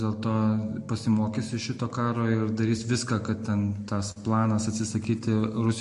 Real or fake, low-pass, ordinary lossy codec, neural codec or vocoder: real; 10.8 kHz; MP3, 48 kbps; none